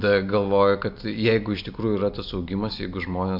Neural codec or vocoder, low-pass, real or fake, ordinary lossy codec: none; 5.4 kHz; real; MP3, 48 kbps